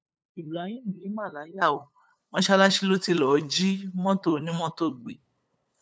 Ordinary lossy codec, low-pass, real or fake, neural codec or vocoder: none; none; fake; codec, 16 kHz, 8 kbps, FunCodec, trained on LibriTTS, 25 frames a second